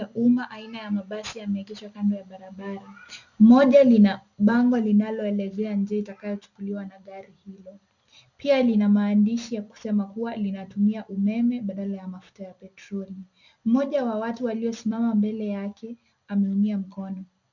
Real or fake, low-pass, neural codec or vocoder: real; 7.2 kHz; none